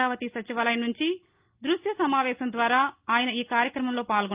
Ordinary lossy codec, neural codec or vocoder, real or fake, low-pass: Opus, 24 kbps; none; real; 3.6 kHz